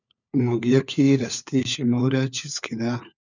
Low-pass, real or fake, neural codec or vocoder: 7.2 kHz; fake; codec, 16 kHz, 4 kbps, FunCodec, trained on LibriTTS, 50 frames a second